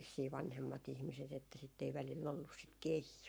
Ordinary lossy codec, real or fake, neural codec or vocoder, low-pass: none; fake; codec, 44.1 kHz, 7.8 kbps, Pupu-Codec; none